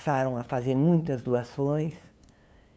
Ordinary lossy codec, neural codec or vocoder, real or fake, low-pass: none; codec, 16 kHz, 2 kbps, FunCodec, trained on LibriTTS, 25 frames a second; fake; none